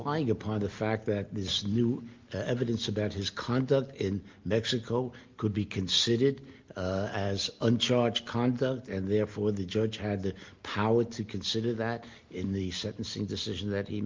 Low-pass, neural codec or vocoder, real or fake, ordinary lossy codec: 7.2 kHz; none; real; Opus, 24 kbps